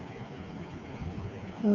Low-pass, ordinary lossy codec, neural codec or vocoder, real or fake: 7.2 kHz; none; codec, 16 kHz, 4 kbps, FreqCodec, smaller model; fake